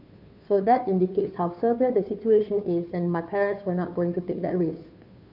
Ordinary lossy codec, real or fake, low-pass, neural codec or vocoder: none; fake; 5.4 kHz; codec, 16 kHz, 2 kbps, FunCodec, trained on Chinese and English, 25 frames a second